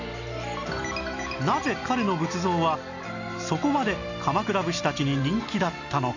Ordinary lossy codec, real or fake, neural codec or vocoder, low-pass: none; real; none; 7.2 kHz